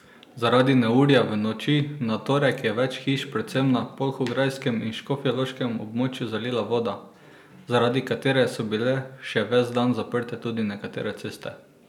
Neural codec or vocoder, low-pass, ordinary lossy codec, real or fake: none; 19.8 kHz; none; real